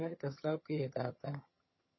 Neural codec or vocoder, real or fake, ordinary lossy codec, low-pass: vocoder, 22.05 kHz, 80 mel bands, HiFi-GAN; fake; MP3, 24 kbps; 7.2 kHz